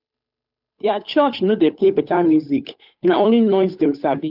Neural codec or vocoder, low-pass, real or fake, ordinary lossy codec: codec, 16 kHz, 8 kbps, FunCodec, trained on Chinese and English, 25 frames a second; 5.4 kHz; fake; none